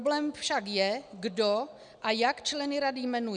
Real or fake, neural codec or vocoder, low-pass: real; none; 9.9 kHz